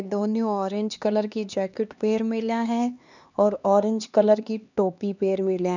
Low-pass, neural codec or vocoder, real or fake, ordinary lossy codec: 7.2 kHz; codec, 16 kHz, 2 kbps, X-Codec, HuBERT features, trained on LibriSpeech; fake; none